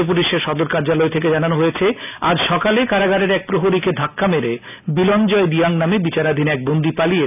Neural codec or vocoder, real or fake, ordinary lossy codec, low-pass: none; real; none; 3.6 kHz